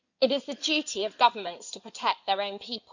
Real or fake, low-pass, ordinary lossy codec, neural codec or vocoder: fake; 7.2 kHz; none; codec, 16 kHz in and 24 kHz out, 2.2 kbps, FireRedTTS-2 codec